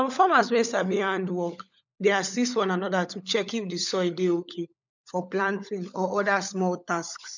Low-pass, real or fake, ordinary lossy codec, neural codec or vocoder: 7.2 kHz; fake; none; codec, 16 kHz, 16 kbps, FunCodec, trained on LibriTTS, 50 frames a second